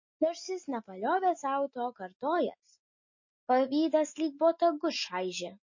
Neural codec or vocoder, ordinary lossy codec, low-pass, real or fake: none; MP3, 32 kbps; 7.2 kHz; real